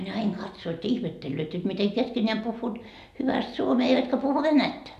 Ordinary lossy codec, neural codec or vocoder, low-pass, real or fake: none; none; 14.4 kHz; real